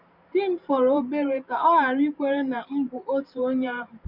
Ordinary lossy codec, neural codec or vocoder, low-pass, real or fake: Opus, 64 kbps; vocoder, 44.1 kHz, 128 mel bands every 512 samples, BigVGAN v2; 5.4 kHz; fake